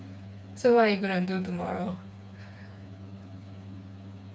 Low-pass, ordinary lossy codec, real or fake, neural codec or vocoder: none; none; fake; codec, 16 kHz, 4 kbps, FreqCodec, smaller model